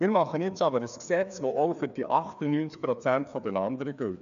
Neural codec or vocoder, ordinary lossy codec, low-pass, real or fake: codec, 16 kHz, 2 kbps, FreqCodec, larger model; none; 7.2 kHz; fake